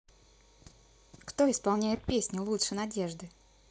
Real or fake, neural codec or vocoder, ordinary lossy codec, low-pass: real; none; none; none